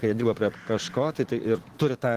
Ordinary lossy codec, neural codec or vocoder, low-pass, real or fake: Opus, 16 kbps; vocoder, 44.1 kHz, 128 mel bands every 512 samples, BigVGAN v2; 14.4 kHz; fake